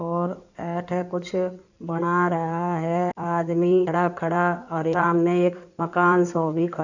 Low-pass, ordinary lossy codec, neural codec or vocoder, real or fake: 7.2 kHz; none; codec, 16 kHz in and 24 kHz out, 2.2 kbps, FireRedTTS-2 codec; fake